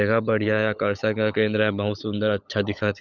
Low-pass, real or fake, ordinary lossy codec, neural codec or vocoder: 7.2 kHz; fake; none; codec, 16 kHz, 16 kbps, FunCodec, trained on LibriTTS, 50 frames a second